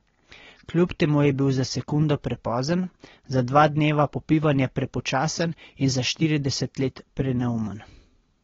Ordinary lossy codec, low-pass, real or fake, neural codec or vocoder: AAC, 24 kbps; 7.2 kHz; real; none